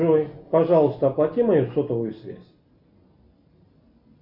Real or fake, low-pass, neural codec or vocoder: real; 5.4 kHz; none